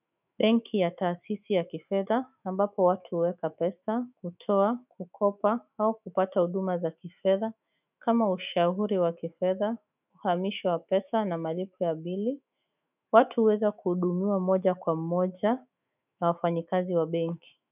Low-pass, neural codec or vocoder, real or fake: 3.6 kHz; autoencoder, 48 kHz, 128 numbers a frame, DAC-VAE, trained on Japanese speech; fake